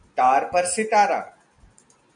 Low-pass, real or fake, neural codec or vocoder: 9.9 kHz; real; none